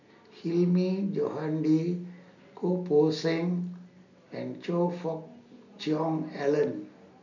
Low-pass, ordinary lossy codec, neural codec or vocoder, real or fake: 7.2 kHz; none; none; real